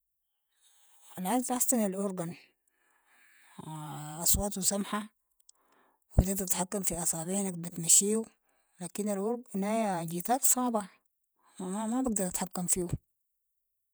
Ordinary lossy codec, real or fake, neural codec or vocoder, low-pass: none; fake; vocoder, 48 kHz, 128 mel bands, Vocos; none